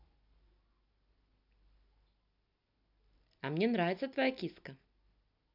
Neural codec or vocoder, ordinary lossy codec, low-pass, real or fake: none; none; 5.4 kHz; real